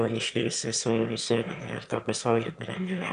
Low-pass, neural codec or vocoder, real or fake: 9.9 kHz; autoencoder, 22.05 kHz, a latent of 192 numbers a frame, VITS, trained on one speaker; fake